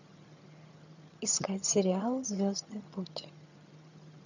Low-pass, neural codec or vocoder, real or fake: 7.2 kHz; vocoder, 22.05 kHz, 80 mel bands, HiFi-GAN; fake